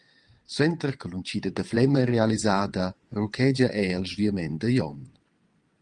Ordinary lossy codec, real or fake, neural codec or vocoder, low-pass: Opus, 24 kbps; fake; vocoder, 22.05 kHz, 80 mel bands, WaveNeXt; 9.9 kHz